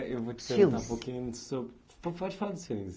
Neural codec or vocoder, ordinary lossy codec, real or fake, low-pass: none; none; real; none